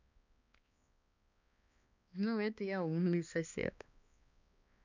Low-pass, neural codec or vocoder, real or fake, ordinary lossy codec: 7.2 kHz; codec, 16 kHz, 2 kbps, X-Codec, HuBERT features, trained on balanced general audio; fake; none